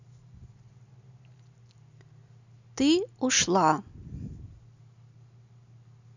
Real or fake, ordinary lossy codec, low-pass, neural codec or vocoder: real; none; 7.2 kHz; none